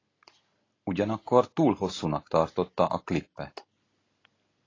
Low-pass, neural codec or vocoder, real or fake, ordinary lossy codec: 7.2 kHz; none; real; AAC, 32 kbps